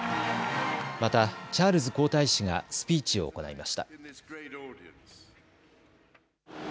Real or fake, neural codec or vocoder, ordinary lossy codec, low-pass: real; none; none; none